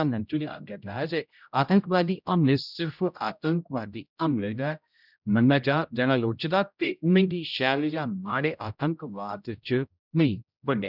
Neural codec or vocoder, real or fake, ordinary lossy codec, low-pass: codec, 16 kHz, 0.5 kbps, X-Codec, HuBERT features, trained on general audio; fake; none; 5.4 kHz